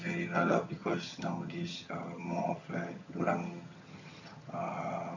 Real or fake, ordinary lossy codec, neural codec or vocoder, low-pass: fake; none; vocoder, 22.05 kHz, 80 mel bands, HiFi-GAN; 7.2 kHz